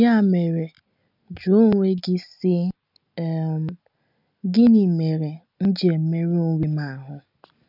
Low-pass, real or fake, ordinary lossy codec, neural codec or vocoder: 5.4 kHz; real; none; none